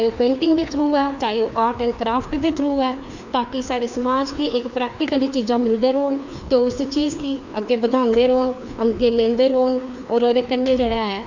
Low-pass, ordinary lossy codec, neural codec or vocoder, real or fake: 7.2 kHz; none; codec, 16 kHz, 2 kbps, FreqCodec, larger model; fake